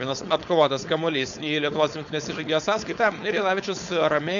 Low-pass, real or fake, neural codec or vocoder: 7.2 kHz; fake; codec, 16 kHz, 4.8 kbps, FACodec